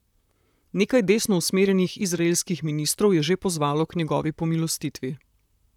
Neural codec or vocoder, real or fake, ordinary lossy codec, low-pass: vocoder, 44.1 kHz, 128 mel bands, Pupu-Vocoder; fake; none; 19.8 kHz